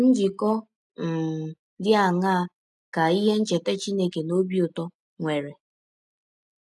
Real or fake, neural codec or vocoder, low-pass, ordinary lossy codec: real; none; none; none